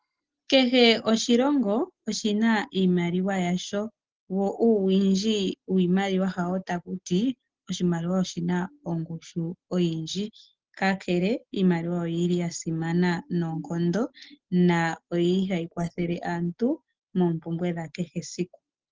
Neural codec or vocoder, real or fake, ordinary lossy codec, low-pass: none; real; Opus, 16 kbps; 7.2 kHz